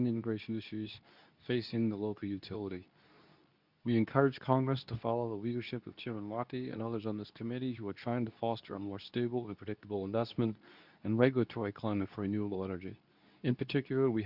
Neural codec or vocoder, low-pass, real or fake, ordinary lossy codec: codec, 24 kHz, 0.9 kbps, WavTokenizer, medium speech release version 2; 5.4 kHz; fake; Opus, 64 kbps